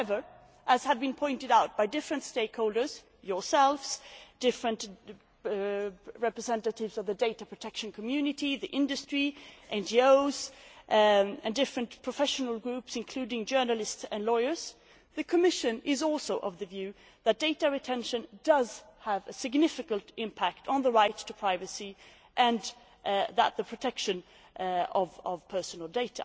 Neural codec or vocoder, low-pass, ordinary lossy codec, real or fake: none; none; none; real